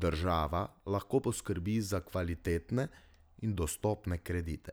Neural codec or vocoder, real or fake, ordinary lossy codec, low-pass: none; real; none; none